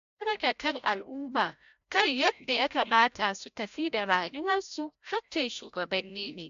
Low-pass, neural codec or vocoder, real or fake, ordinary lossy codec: 7.2 kHz; codec, 16 kHz, 0.5 kbps, FreqCodec, larger model; fake; AAC, 64 kbps